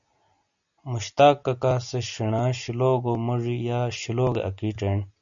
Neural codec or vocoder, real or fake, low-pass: none; real; 7.2 kHz